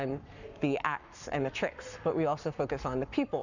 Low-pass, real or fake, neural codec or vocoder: 7.2 kHz; fake; codec, 44.1 kHz, 7.8 kbps, Pupu-Codec